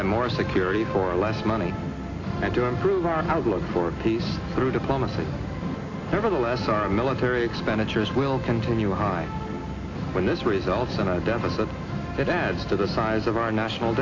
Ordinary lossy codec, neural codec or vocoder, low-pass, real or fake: AAC, 32 kbps; none; 7.2 kHz; real